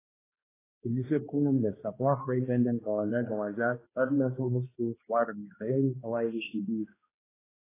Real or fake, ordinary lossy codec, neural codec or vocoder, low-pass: fake; AAC, 16 kbps; codec, 16 kHz, 1 kbps, X-Codec, HuBERT features, trained on balanced general audio; 3.6 kHz